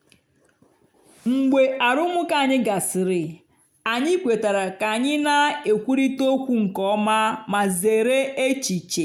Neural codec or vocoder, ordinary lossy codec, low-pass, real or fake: none; none; 19.8 kHz; real